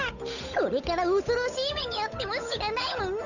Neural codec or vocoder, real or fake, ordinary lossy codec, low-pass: codec, 16 kHz, 8 kbps, FunCodec, trained on Chinese and English, 25 frames a second; fake; none; 7.2 kHz